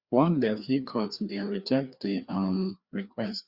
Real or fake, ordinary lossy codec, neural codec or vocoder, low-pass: fake; Opus, 64 kbps; codec, 16 kHz, 2 kbps, FreqCodec, larger model; 5.4 kHz